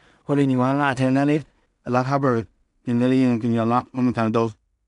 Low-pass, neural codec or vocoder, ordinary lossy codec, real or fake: 10.8 kHz; codec, 16 kHz in and 24 kHz out, 0.4 kbps, LongCat-Audio-Codec, two codebook decoder; none; fake